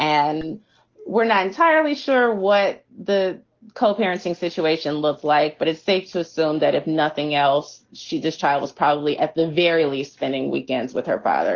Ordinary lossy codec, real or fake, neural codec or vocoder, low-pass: Opus, 16 kbps; real; none; 7.2 kHz